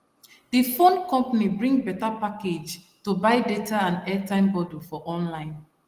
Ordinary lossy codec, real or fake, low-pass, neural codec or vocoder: Opus, 24 kbps; real; 14.4 kHz; none